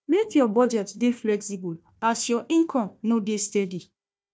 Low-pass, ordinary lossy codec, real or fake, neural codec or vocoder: none; none; fake; codec, 16 kHz, 1 kbps, FunCodec, trained on Chinese and English, 50 frames a second